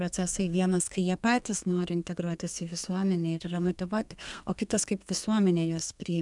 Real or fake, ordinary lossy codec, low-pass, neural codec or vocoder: fake; MP3, 96 kbps; 10.8 kHz; codec, 32 kHz, 1.9 kbps, SNAC